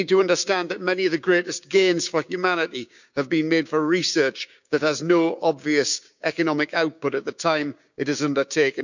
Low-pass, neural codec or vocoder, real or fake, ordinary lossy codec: 7.2 kHz; codec, 16 kHz, 6 kbps, DAC; fake; none